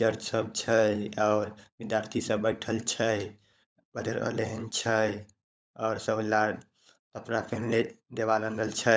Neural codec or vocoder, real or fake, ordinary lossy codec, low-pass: codec, 16 kHz, 16 kbps, FunCodec, trained on LibriTTS, 50 frames a second; fake; none; none